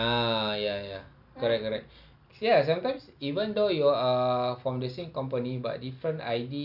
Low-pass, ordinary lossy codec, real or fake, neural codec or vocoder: 5.4 kHz; none; real; none